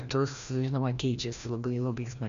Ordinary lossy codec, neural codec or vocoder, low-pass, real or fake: Opus, 64 kbps; codec, 16 kHz, 1 kbps, FreqCodec, larger model; 7.2 kHz; fake